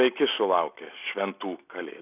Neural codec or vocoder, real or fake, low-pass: none; real; 3.6 kHz